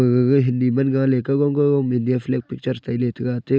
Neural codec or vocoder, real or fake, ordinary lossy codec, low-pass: none; real; none; none